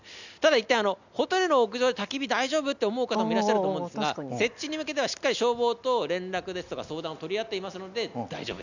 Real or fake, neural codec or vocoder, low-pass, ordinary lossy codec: real; none; 7.2 kHz; none